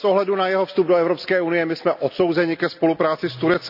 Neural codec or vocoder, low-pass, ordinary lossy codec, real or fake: none; 5.4 kHz; none; real